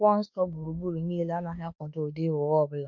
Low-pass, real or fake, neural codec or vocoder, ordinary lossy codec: 7.2 kHz; fake; autoencoder, 48 kHz, 32 numbers a frame, DAC-VAE, trained on Japanese speech; MP3, 48 kbps